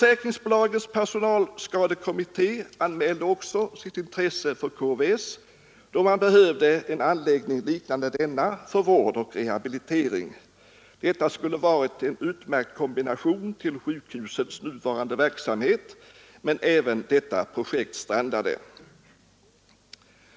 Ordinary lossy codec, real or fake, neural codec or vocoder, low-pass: none; real; none; none